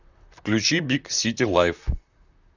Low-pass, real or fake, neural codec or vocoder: 7.2 kHz; fake; vocoder, 44.1 kHz, 128 mel bands, Pupu-Vocoder